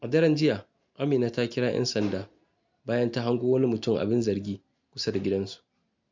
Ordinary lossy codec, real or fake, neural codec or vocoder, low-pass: MP3, 64 kbps; real; none; 7.2 kHz